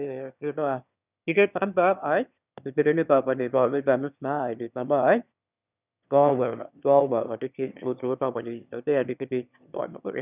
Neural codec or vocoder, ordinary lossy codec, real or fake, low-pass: autoencoder, 22.05 kHz, a latent of 192 numbers a frame, VITS, trained on one speaker; none; fake; 3.6 kHz